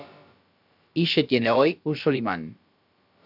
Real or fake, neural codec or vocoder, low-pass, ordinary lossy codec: fake; codec, 16 kHz, about 1 kbps, DyCAST, with the encoder's durations; 5.4 kHz; AAC, 48 kbps